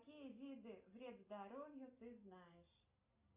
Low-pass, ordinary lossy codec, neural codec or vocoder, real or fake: 3.6 kHz; Opus, 64 kbps; none; real